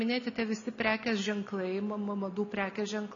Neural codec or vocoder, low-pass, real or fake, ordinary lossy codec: none; 7.2 kHz; real; AAC, 64 kbps